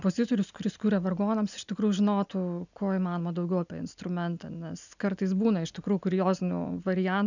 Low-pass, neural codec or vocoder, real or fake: 7.2 kHz; none; real